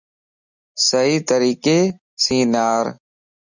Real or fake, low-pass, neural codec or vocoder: real; 7.2 kHz; none